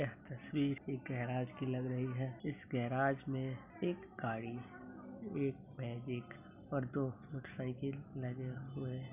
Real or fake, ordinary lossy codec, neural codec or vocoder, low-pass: real; none; none; 3.6 kHz